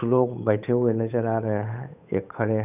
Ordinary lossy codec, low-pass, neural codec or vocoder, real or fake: none; 3.6 kHz; codec, 16 kHz, 8 kbps, FunCodec, trained on Chinese and English, 25 frames a second; fake